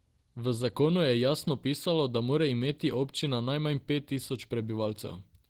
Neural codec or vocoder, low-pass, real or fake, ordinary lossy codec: none; 19.8 kHz; real; Opus, 16 kbps